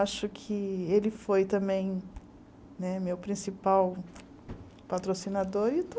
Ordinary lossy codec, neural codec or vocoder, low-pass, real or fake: none; none; none; real